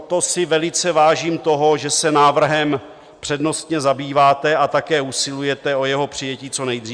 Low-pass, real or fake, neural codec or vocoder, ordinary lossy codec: 9.9 kHz; real; none; Opus, 64 kbps